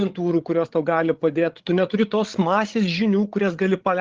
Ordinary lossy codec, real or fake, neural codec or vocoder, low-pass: Opus, 16 kbps; fake; codec, 16 kHz, 16 kbps, FreqCodec, larger model; 7.2 kHz